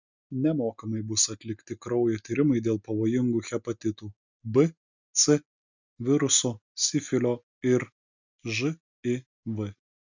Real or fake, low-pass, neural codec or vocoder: real; 7.2 kHz; none